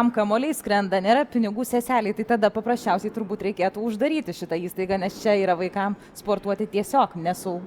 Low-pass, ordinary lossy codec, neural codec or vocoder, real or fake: 19.8 kHz; Opus, 64 kbps; none; real